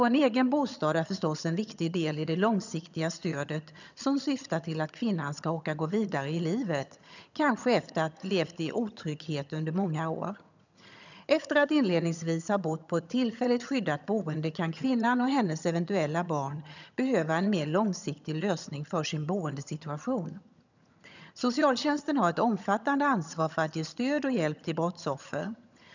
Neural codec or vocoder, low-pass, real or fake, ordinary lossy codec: vocoder, 22.05 kHz, 80 mel bands, HiFi-GAN; 7.2 kHz; fake; none